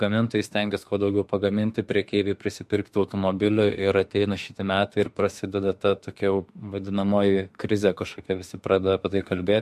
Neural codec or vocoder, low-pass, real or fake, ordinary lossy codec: autoencoder, 48 kHz, 32 numbers a frame, DAC-VAE, trained on Japanese speech; 14.4 kHz; fake; MP3, 64 kbps